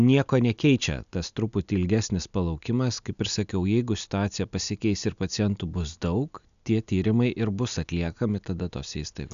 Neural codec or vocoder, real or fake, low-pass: none; real; 7.2 kHz